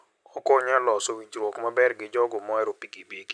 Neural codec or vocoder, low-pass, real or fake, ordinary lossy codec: none; 9.9 kHz; real; none